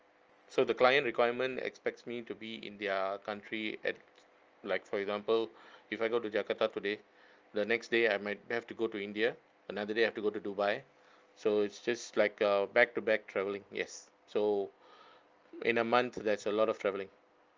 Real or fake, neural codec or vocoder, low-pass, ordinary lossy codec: real; none; 7.2 kHz; Opus, 24 kbps